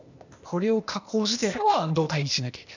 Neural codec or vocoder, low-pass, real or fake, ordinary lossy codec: codec, 16 kHz, 0.8 kbps, ZipCodec; 7.2 kHz; fake; none